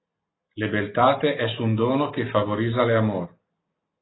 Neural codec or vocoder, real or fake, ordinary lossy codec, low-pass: none; real; AAC, 16 kbps; 7.2 kHz